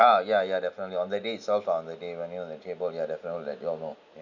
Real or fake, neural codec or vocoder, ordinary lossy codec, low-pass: real; none; none; 7.2 kHz